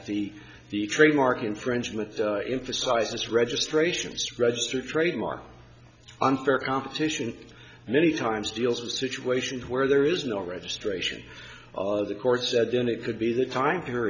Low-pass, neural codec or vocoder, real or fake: 7.2 kHz; none; real